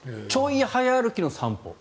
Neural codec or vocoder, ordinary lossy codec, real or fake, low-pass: none; none; real; none